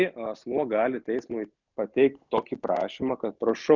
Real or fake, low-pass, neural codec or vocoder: real; 7.2 kHz; none